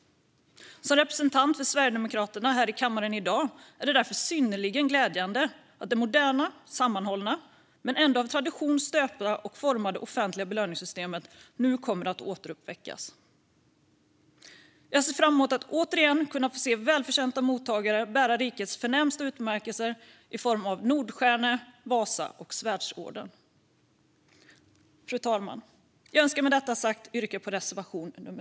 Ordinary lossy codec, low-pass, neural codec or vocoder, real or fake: none; none; none; real